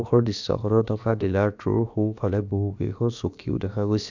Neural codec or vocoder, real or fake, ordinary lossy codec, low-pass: codec, 16 kHz, about 1 kbps, DyCAST, with the encoder's durations; fake; none; 7.2 kHz